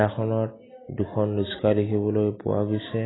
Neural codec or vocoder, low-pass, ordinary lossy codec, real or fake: none; 7.2 kHz; AAC, 16 kbps; real